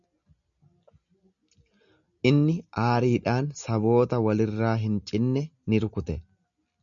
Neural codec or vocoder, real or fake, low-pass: none; real; 7.2 kHz